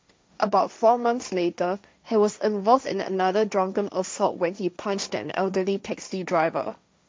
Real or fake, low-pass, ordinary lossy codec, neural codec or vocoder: fake; none; none; codec, 16 kHz, 1.1 kbps, Voila-Tokenizer